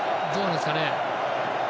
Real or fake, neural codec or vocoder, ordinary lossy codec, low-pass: real; none; none; none